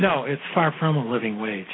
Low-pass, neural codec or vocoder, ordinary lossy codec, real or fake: 7.2 kHz; none; AAC, 16 kbps; real